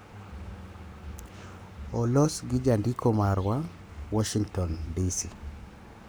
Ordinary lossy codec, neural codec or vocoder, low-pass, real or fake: none; codec, 44.1 kHz, 7.8 kbps, Pupu-Codec; none; fake